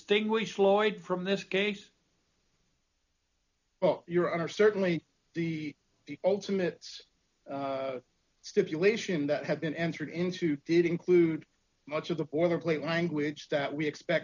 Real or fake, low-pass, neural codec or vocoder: real; 7.2 kHz; none